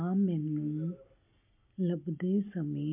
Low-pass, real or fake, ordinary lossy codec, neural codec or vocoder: 3.6 kHz; real; MP3, 32 kbps; none